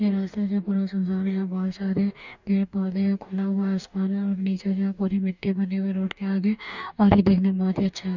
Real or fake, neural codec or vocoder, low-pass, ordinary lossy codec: fake; codec, 44.1 kHz, 2.6 kbps, DAC; 7.2 kHz; none